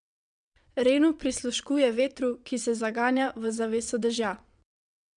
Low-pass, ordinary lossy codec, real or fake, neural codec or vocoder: 9.9 kHz; none; fake; vocoder, 22.05 kHz, 80 mel bands, WaveNeXt